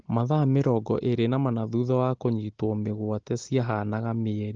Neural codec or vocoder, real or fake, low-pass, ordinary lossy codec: none; real; 7.2 kHz; Opus, 16 kbps